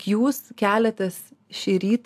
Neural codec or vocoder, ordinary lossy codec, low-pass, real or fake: none; AAC, 96 kbps; 14.4 kHz; real